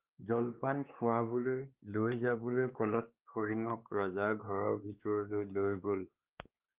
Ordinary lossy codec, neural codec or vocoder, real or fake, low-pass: Opus, 32 kbps; codec, 16 kHz, 2 kbps, X-Codec, WavLM features, trained on Multilingual LibriSpeech; fake; 3.6 kHz